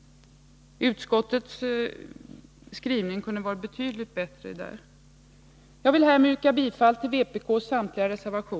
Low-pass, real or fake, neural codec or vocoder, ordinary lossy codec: none; real; none; none